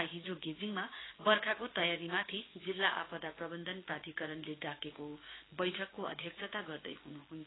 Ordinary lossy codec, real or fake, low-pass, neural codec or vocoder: AAC, 16 kbps; fake; 7.2 kHz; codec, 16 kHz, 6 kbps, DAC